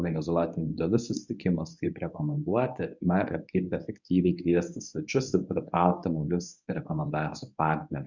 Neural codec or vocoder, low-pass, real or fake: codec, 24 kHz, 0.9 kbps, WavTokenizer, medium speech release version 1; 7.2 kHz; fake